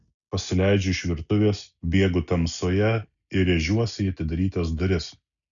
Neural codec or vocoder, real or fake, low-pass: none; real; 7.2 kHz